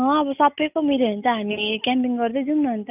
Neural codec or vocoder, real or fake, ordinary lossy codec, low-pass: none; real; none; 3.6 kHz